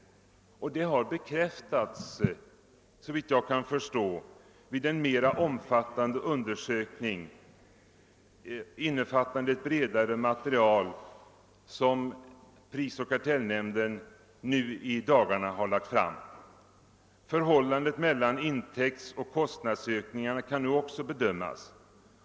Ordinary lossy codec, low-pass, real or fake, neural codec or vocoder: none; none; real; none